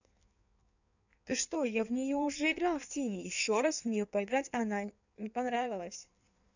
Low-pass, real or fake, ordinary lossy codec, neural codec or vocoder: 7.2 kHz; fake; none; codec, 16 kHz in and 24 kHz out, 1.1 kbps, FireRedTTS-2 codec